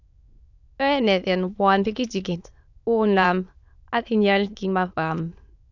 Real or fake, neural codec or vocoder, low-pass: fake; autoencoder, 22.05 kHz, a latent of 192 numbers a frame, VITS, trained on many speakers; 7.2 kHz